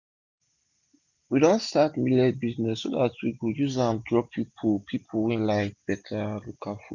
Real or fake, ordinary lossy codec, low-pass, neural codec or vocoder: real; none; 7.2 kHz; none